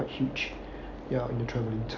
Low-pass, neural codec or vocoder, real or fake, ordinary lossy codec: 7.2 kHz; none; real; none